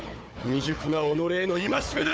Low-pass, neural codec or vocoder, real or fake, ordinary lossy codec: none; codec, 16 kHz, 4 kbps, FunCodec, trained on Chinese and English, 50 frames a second; fake; none